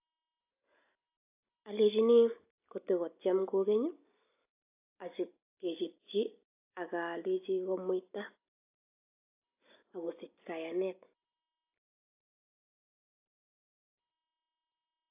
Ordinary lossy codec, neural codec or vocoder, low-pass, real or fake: AAC, 32 kbps; none; 3.6 kHz; real